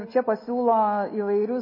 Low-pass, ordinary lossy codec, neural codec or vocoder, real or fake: 5.4 kHz; MP3, 24 kbps; none; real